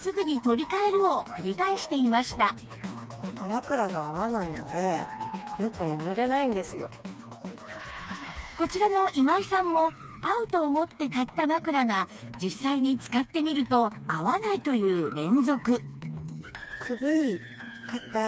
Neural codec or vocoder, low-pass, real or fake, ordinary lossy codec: codec, 16 kHz, 2 kbps, FreqCodec, smaller model; none; fake; none